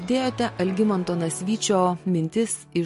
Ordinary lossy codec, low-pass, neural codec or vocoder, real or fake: MP3, 48 kbps; 14.4 kHz; none; real